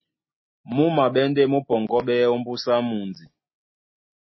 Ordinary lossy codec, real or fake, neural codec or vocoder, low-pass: MP3, 24 kbps; real; none; 7.2 kHz